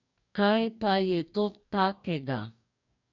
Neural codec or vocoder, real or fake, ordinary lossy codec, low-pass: codec, 44.1 kHz, 2.6 kbps, DAC; fake; none; 7.2 kHz